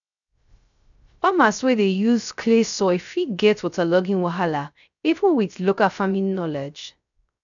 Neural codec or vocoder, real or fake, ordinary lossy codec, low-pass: codec, 16 kHz, 0.3 kbps, FocalCodec; fake; none; 7.2 kHz